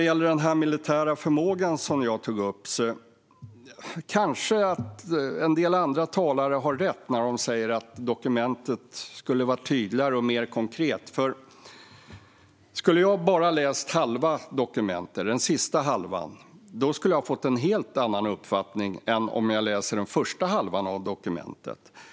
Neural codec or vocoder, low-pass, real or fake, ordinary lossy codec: none; none; real; none